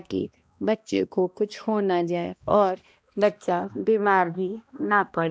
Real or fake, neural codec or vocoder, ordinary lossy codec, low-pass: fake; codec, 16 kHz, 1 kbps, X-Codec, HuBERT features, trained on balanced general audio; none; none